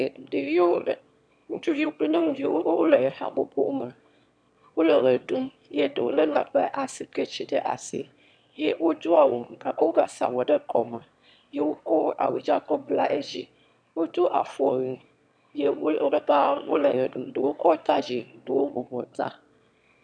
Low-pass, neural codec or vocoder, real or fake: 9.9 kHz; autoencoder, 22.05 kHz, a latent of 192 numbers a frame, VITS, trained on one speaker; fake